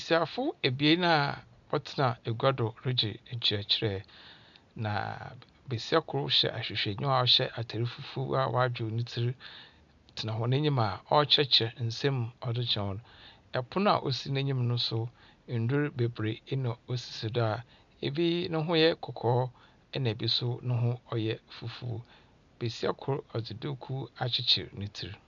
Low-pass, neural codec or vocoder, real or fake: 7.2 kHz; none; real